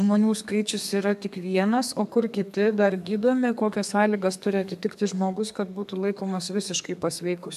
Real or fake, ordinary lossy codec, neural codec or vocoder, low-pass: fake; AAC, 96 kbps; codec, 32 kHz, 1.9 kbps, SNAC; 14.4 kHz